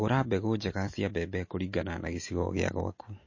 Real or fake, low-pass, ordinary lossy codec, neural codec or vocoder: real; 7.2 kHz; MP3, 32 kbps; none